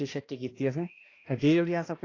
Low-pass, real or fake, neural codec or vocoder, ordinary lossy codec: 7.2 kHz; fake; codec, 16 kHz, 1 kbps, X-Codec, HuBERT features, trained on balanced general audio; AAC, 32 kbps